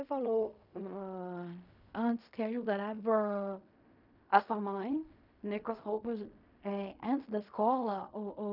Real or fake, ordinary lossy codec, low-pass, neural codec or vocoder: fake; none; 5.4 kHz; codec, 16 kHz in and 24 kHz out, 0.4 kbps, LongCat-Audio-Codec, fine tuned four codebook decoder